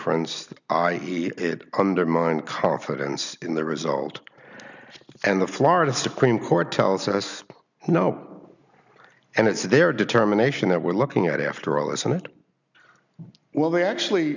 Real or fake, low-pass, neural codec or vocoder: real; 7.2 kHz; none